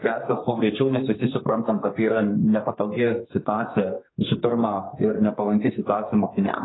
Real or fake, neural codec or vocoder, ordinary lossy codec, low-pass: fake; codec, 32 kHz, 1.9 kbps, SNAC; AAC, 16 kbps; 7.2 kHz